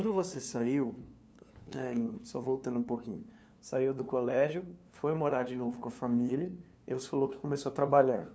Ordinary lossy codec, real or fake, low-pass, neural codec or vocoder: none; fake; none; codec, 16 kHz, 2 kbps, FunCodec, trained on LibriTTS, 25 frames a second